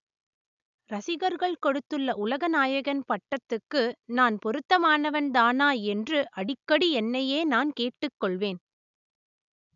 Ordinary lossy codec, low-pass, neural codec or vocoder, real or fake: none; 7.2 kHz; none; real